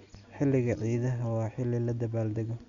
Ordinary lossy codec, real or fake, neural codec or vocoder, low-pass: MP3, 96 kbps; real; none; 7.2 kHz